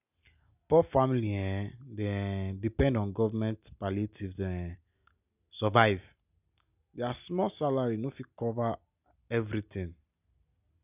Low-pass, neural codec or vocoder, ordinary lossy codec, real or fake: 3.6 kHz; none; none; real